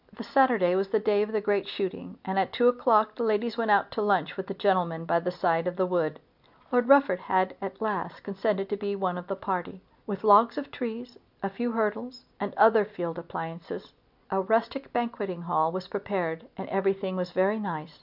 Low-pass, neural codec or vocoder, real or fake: 5.4 kHz; none; real